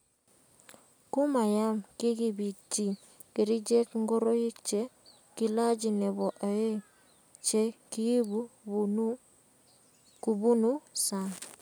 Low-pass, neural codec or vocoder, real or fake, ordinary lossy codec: none; none; real; none